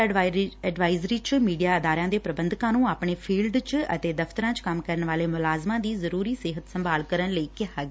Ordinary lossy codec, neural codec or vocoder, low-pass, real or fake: none; none; none; real